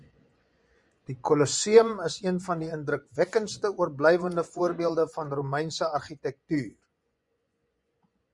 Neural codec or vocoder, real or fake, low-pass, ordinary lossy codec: vocoder, 24 kHz, 100 mel bands, Vocos; fake; 10.8 kHz; Opus, 64 kbps